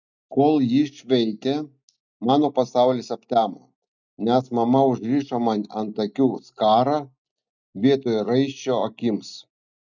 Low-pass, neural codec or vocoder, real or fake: 7.2 kHz; none; real